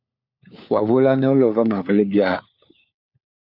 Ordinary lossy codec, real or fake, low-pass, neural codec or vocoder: AAC, 48 kbps; fake; 5.4 kHz; codec, 16 kHz, 4 kbps, FunCodec, trained on LibriTTS, 50 frames a second